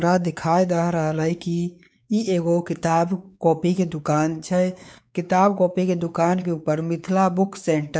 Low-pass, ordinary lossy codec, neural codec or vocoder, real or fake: none; none; codec, 16 kHz, 4 kbps, X-Codec, WavLM features, trained on Multilingual LibriSpeech; fake